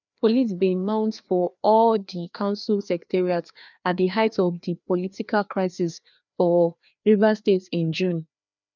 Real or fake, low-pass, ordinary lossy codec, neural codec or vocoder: fake; 7.2 kHz; none; codec, 16 kHz, 2 kbps, FreqCodec, larger model